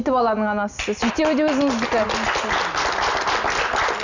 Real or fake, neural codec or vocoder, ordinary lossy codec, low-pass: real; none; none; 7.2 kHz